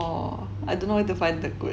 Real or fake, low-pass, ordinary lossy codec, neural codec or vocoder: real; none; none; none